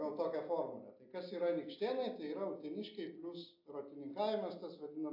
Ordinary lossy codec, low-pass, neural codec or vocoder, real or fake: AAC, 48 kbps; 5.4 kHz; none; real